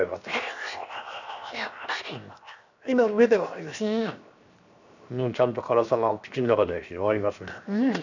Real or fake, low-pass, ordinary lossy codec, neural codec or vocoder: fake; 7.2 kHz; none; codec, 16 kHz, 0.7 kbps, FocalCodec